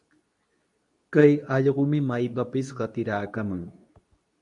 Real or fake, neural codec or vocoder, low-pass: fake; codec, 24 kHz, 0.9 kbps, WavTokenizer, medium speech release version 2; 10.8 kHz